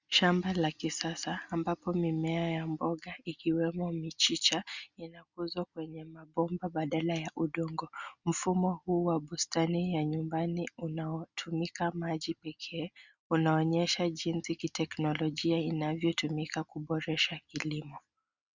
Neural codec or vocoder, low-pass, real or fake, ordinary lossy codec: none; 7.2 kHz; real; Opus, 64 kbps